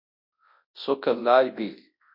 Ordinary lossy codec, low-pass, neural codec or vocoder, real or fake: MP3, 32 kbps; 5.4 kHz; codec, 24 kHz, 0.9 kbps, WavTokenizer, large speech release; fake